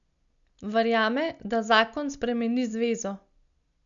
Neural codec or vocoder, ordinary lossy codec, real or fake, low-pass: none; MP3, 96 kbps; real; 7.2 kHz